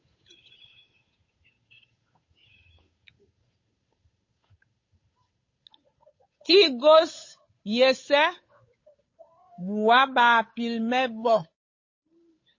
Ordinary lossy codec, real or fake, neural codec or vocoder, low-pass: MP3, 32 kbps; fake; codec, 16 kHz, 8 kbps, FunCodec, trained on Chinese and English, 25 frames a second; 7.2 kHz